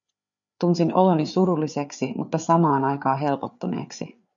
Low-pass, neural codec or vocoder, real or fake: 7.2 kHz; codec, 16 kHz, 4 kbps, FreqCodec, larger model; fake